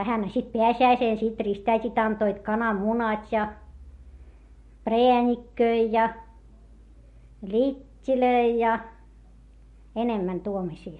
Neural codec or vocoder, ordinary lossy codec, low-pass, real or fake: none; MP3, 48 kbps; 14.4 kHz; real